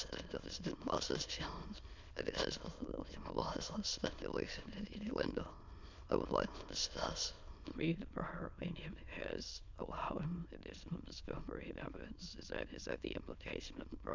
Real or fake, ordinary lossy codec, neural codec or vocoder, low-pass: fake; MP3, 64 kbps; autoencoder, 22.05 kHz, a latent of 192 numbers a frame, VITS, trained on many speakers; 7.2 kHz